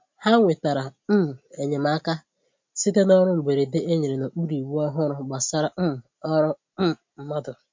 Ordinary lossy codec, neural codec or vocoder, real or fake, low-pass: MP3, 48 kbps; none; real; 7.2 kHz